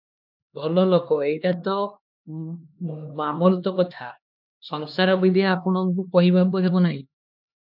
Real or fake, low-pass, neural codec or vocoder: fake; 5.4 kHz; codec, 16 kHz, 2 kbps, X-Codec, HuBERT features, trained on LibriSpeech